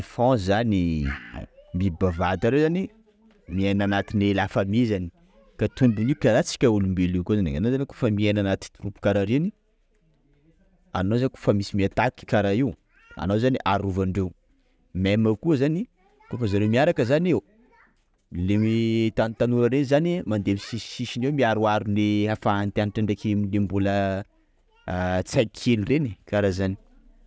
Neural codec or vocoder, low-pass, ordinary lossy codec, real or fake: none; none; none; real